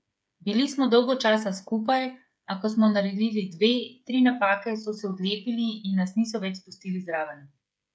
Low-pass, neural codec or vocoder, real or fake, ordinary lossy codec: none; codec, 16 kHz, 8 kbps, FreqCodec, smaller model; fake; none